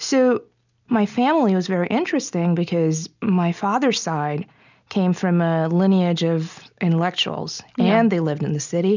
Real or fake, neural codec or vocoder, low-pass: real; none; 7.2 kHz